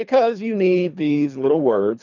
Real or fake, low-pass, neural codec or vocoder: fake; 7.2 kHz; codec, 24 kHz, 3 kbps, HILCodec